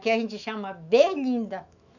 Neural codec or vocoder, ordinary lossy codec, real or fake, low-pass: autoencoder, 48 kHz, 128 numbers a frame, DAC-VAE, trained on Japanese speech; none; fake; 7.2 kHz